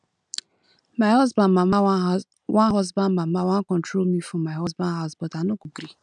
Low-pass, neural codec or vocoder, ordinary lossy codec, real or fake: 10.8 kHz; none; none; real